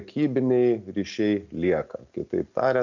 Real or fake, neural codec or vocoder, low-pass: real; none; 7.2 kHz